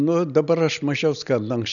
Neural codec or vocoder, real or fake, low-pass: none; real; 7.2 kHz